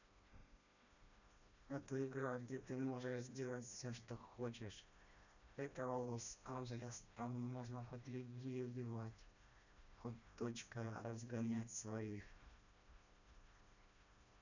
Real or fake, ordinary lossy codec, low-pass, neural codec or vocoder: fake; none; 7.2 kHz; codec, 16 kHz, 1 kbps, FreqCodec, smaller model